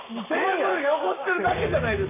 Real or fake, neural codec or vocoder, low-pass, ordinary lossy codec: fake; codec, 44.1 kHz, 7.8 kbps, Pupu-Codec; 3.6 kHz; none